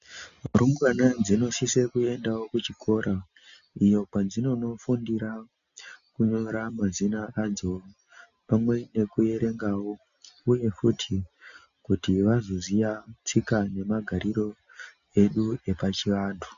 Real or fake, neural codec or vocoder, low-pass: real; none; 7.2 kHz